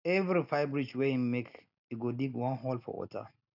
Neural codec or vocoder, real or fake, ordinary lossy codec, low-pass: none; real; none; 5.4 kHz